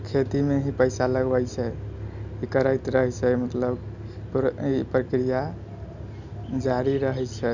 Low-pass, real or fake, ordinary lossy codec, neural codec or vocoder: 7.2 kHz; real; none; none